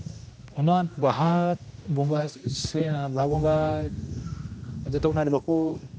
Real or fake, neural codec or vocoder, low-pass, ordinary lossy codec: fake; codec, 16 kHz, 1 kbps, X-Codec, HuBERT features, trained on general audio; none; none